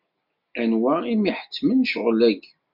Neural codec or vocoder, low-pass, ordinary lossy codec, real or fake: none; 5.4 kHz; MP3, 48 kbps; real